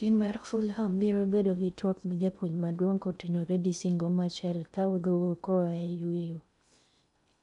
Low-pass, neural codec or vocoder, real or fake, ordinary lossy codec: 10.8 kHz; codec, 16 kHz in and 24 kHz out, 0.6 kbps, FocalCodec, streaming, 2048 codes; fake; none